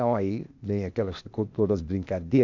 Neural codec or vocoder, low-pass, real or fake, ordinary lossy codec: codec, 16 kHz, 0.8 kbps, ZipCodec; 7.2 kHz; fake; none